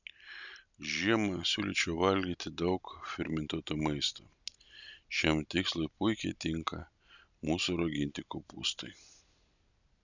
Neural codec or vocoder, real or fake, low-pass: none; real; 7.2 kHz